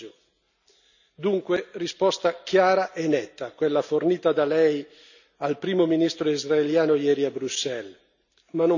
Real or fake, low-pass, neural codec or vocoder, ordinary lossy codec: real; 7.2 kHz; none; none